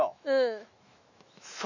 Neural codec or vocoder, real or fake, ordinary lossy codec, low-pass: autoencoder, 48 kHz, 128 numbers a frame, DAC-VAE, trained on Japanese speech; fake; none; 7.2 kHz